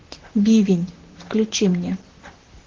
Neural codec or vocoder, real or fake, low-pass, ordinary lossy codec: vocoder, 44.1 kHz, 128 mel bands, Pupu-Vocoder; fake; 7.2 kHz; Opus, 16 kbps